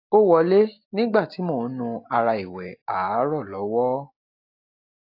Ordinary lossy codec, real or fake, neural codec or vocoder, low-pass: AAC, 32 kbps; real; none; 5.4 kHz